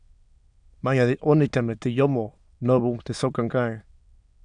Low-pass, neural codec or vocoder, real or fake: 9.9 kHz; autoencoder, 22.05 kHz, a latent of 192 numbers a frame, VITS, trained on many speakers; fake